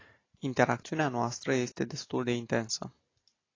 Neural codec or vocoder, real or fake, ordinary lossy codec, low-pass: none; real; AAC, 32 kbps; 7.2 kHz